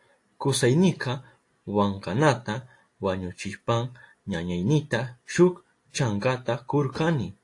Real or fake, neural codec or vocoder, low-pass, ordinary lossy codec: real; none; 10.8 kHz; AAC, 48 kbps